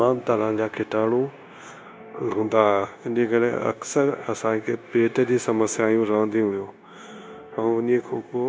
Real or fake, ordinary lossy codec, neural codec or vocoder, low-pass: fake; none; codec, 16 kHz, 0.9 kbps, LongCat-Audio-Codec; none